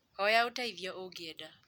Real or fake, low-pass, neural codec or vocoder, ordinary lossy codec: real; 19.8 kHz; none; none